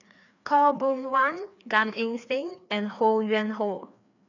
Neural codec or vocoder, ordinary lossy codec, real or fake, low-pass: codec, 16 kHz, 2 kbps, FreqCodec, larger model; none; fake; 7.2 kHz